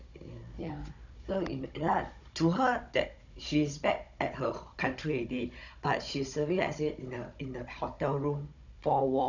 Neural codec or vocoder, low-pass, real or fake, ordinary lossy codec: codec, 16 kHz, 16 kbps, FunCodec, trained on Chinese and English, 50 frames a second; 7.2 kHz; fake; none